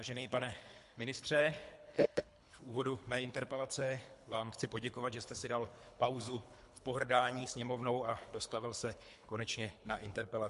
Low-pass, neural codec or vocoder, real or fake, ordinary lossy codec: 10.8 kHz; codec, 24 kHz, 3 kbps, HILCodec; fake; MP3, 64 kbps